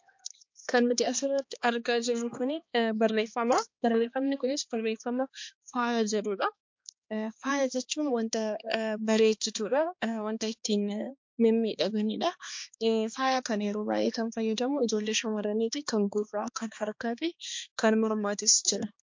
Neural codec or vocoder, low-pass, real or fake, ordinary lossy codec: codec, 16 kHz, 2 kbps, X-Codec, HuBERT features, trained on balanced general audio; 7.2 kHz; fake; MP3, 48 kbps